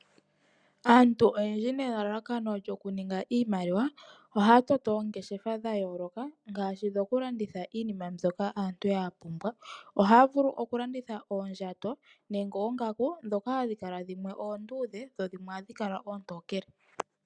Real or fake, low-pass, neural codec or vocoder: real; 9.9 kHz; none